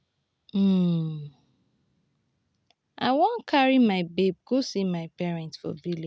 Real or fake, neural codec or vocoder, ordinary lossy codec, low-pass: real; none; none; none